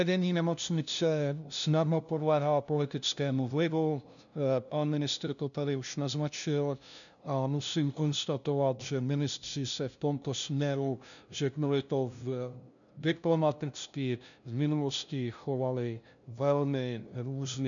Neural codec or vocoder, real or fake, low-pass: codec, 16 kHz, 0.5 kbps, FunCodec, trained on LibriTTS, 25 frames a second; fake; 7.2 kHz